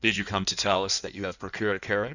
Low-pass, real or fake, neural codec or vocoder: 7.2 kHz; fake; codec, 16 kHz in and 24 kHz out, 1.1 kbps, FireRedTTS-2 codec